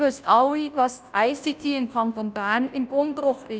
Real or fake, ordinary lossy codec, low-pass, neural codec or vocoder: fake; none; none; codec, 16 kHz, 0.5 kbps, FunCodec, trained on Chinese and English, 25 frames a second